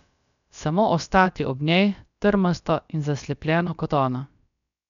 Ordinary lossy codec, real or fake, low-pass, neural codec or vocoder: none; fake; 7.2 kHz; codec, 16 kHz, about 1 kbps, DyCAST, with the encoder's durations